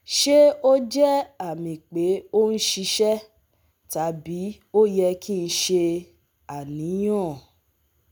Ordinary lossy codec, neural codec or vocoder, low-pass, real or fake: none; none; none; real